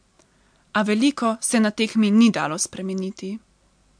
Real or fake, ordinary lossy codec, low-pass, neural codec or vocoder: real; MP3, 64 kbps; 9.9 kHz; none